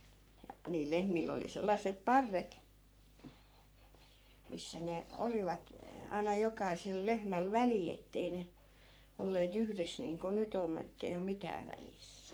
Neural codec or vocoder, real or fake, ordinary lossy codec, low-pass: codec, 44.1 kHz, 3.4 kbps, Pupu-Codec; fake; none; none